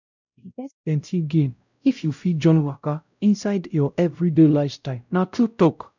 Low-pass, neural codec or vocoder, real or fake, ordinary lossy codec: 7.2 kHz; codec, 16 kHz, 0.5 kbps, X-Codec, WavLM features, trained on Multilingual LibriSpeech; fake; none